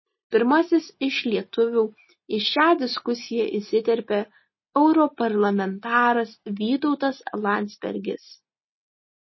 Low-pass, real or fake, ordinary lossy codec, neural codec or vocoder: 7.2 kHz; real; MP3, 24 kbps; none